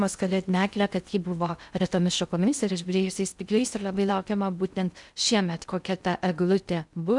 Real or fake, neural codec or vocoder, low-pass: fake; codec, 16 kHz in and 24 kHz out, 0.6 kbps, FocalCodec, streaming, 2048 codes; 10.8 kHz